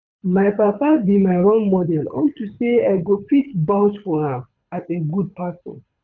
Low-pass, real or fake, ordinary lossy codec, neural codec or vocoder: 7.2 kHz; fake; none; codec, 24 kHz, 6 kbps, HILCodec